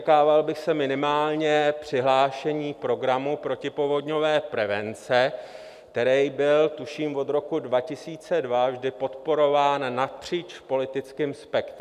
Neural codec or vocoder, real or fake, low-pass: none; real; 14.4 kHz